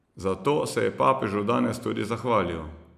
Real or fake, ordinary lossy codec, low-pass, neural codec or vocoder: real; none; 14.4 kHz; none